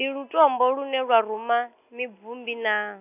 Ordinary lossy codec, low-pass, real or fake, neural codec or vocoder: none; 3.6 kHz; real; none